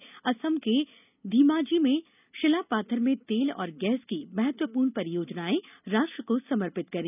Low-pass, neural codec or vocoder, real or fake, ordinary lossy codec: 3.6 kHz; none; real; none